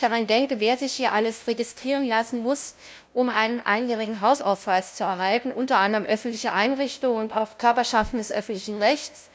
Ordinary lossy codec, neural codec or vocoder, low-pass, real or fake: none; codec, 16 kHz, 0.5 kbps, FunCodec, trained on LibriTTS, 25 frames a second; none; fake